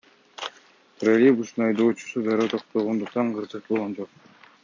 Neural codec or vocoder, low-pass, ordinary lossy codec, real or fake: none; 7.2 kHz; MP3, 48 kbps; real